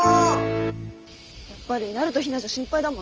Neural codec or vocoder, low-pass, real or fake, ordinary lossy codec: none; 7.2 kHz; real; Opus, 24 kbps